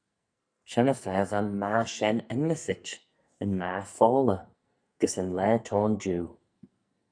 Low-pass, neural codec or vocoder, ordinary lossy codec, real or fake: 9.9 kHz; codec, 44.1 kHz, 2.6 kbps, SNAC; MP3, 96 kbps; fake